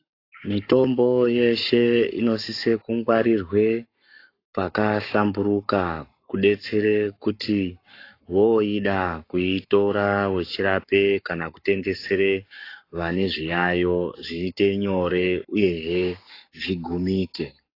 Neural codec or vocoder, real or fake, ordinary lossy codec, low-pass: codec, 44.1 kHz, 7.8 kbps, Pupu-Codec; fake; AAC, 32 kbps; 5.4 kHz